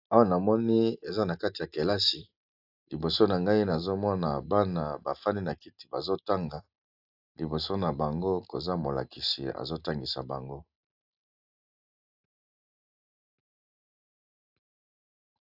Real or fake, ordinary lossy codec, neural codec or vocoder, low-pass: real; AAC, 48 kbps; none; 5.4 kHz